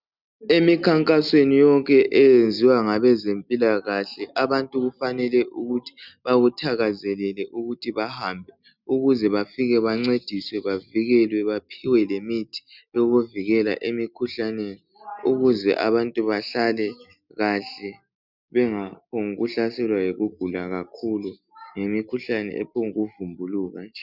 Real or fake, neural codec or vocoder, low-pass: real; none; 5.4 kHz